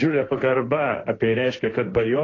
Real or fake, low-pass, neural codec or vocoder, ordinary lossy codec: fake; 7.2 kHz; codec, 16 kHz, 1.1 kbps, Voila-Tokenizer; AAC, 32 kbps